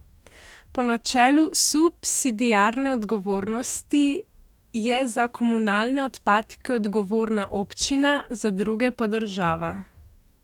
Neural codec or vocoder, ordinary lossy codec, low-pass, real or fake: codec, 44.1 kHz, 2.6 kbps, DAC; none; 19.8 kHz; fake